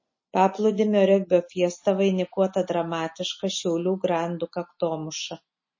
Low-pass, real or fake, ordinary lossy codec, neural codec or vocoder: 7.2 kHz; real; MP3, 32 kbps; none